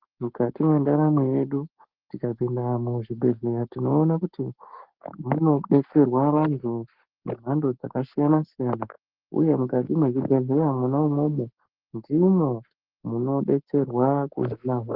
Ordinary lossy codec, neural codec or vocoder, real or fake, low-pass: Opus, 16 kbps; codec, 44.1 kHz, 7.8 kbps, Pupu-Codec; fake; 5.4 kHz